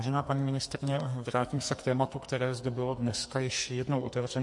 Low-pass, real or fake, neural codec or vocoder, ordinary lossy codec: 10.8 kHz; fake; codec, 44.1 kHz, 2.6 kbps, SNAC; MP3, 48 kbps